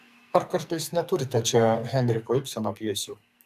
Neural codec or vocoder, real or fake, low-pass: codec, 44.1 kHz, 2.6 kbps, SNAC; fake; 14.4 kHz